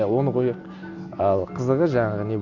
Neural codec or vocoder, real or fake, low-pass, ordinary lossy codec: none; real; 7.2 kHz; none